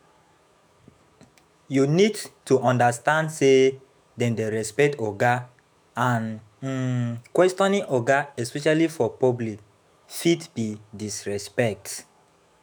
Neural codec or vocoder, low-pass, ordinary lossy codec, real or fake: autoencoder, 48 kHz, 128 numbers a frame, DAC-VAE, trained on Japanese speech; none; none; fake